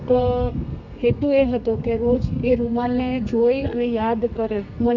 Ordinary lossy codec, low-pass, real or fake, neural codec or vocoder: none; 7.2 kHz; fake; codec, 32 kHz, 1.9 kbps, SNAC